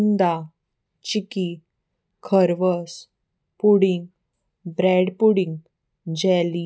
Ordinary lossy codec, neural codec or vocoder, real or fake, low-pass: none; none; real; none